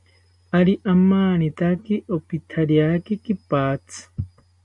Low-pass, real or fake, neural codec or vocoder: 10.8 kHz; real; none